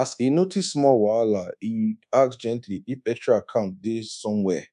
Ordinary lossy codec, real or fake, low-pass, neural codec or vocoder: none; fake; 10.8 kHz; codec, 24 kHz, 1.2 kbps, DualCodec